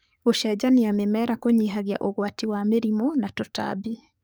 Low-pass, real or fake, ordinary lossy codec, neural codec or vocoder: none; fake; none; codec, 44.1 kHz, 7.8 kbps, DAC